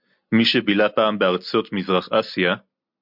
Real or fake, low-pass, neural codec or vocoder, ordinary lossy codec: real; 5.4 kHz; none; MP3, 48 kbps